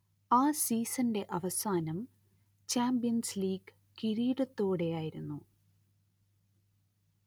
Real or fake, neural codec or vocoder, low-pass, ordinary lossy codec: real; none; none; none